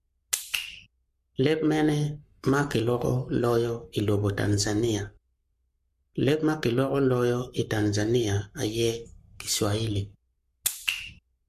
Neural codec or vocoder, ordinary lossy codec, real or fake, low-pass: codec, 44.1 kHz, 7.8 kbps, Pupu-Codec; MP3, 64 kbps; fake; 14.4 kHz